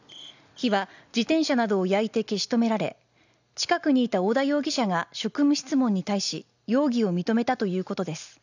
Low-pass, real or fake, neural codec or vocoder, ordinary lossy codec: 7.2 kHz; real; none; none